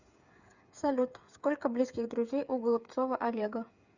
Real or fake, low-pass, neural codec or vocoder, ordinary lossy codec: fake; 7.2 kHz; codec, 16 kHz, 8 kbps, FreqCodec, smaller model; Opus, 64 kbps